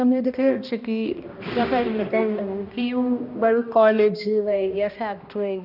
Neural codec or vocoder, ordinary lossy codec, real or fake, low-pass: codec, 16 kHz, 1 kbps, X-Codec, HuBERT features, trained on balanced general audio; none; fake; 5.4 kHz